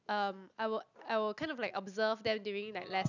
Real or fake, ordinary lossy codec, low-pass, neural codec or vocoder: real; none; 7.2 kHz; none